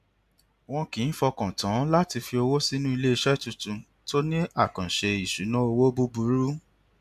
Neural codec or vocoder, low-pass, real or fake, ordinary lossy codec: none; 14.4 kHz; real; AAC, 96 kbps